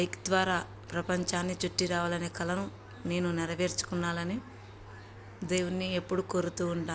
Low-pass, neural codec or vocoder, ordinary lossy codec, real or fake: none; none; none; real